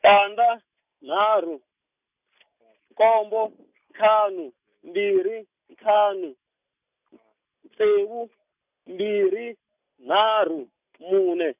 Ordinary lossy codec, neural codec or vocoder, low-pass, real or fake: none; none; 3.6 kHz; real